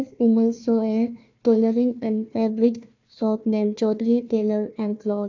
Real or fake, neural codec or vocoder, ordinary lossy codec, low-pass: fake; codec, 16 kHz, 1 kbps, FunCodec, trained on Chinese and English, 50 frames a second; none; 7.2 kHz